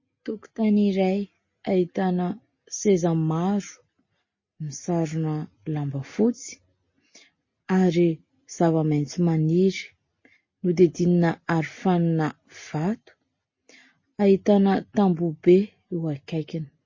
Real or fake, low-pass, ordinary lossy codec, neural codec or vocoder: real; 7.2 kHz; MP3, 32 kbps; none